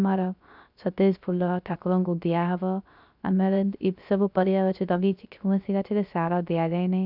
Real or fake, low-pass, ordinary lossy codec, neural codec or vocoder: fake; 5.4 kHz; none; codec, 16 kHz, 0.3 kbps, FocalCodec